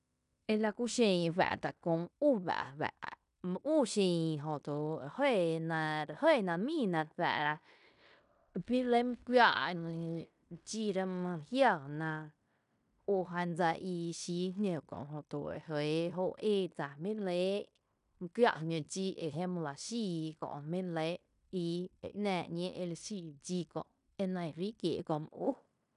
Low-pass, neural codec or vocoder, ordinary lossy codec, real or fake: 10.8 kHz; codec, 16 kHz in and 24 kHz out, 0.9 kbps, LongCat-Audio-Codec, fine tuned four codebook decoder; none; fake